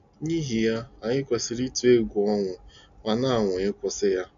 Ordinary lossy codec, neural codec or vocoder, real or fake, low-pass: none; none; real; 7.2 kHz